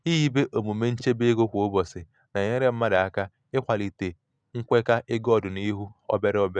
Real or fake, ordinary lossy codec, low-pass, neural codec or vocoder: real; none; 9.9 kHz; none